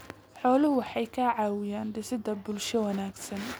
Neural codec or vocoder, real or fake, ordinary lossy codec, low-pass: none; real; none; none